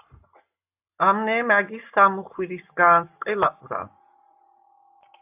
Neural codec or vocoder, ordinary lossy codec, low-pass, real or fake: none; AAC, 32 kbps; 3.6 kHz; real